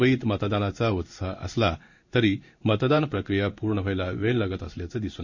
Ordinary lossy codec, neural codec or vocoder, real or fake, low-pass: none; codec, 16 kHz in and 24 kHz out, 1 kbps, XY-Tokenizer; fake; 7.2 kHz